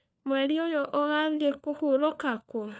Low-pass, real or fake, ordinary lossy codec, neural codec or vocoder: none; fake; none; codec, 16 kHz, 4 kbps, FunCodec, trained on LibriTTS, 50 frames a second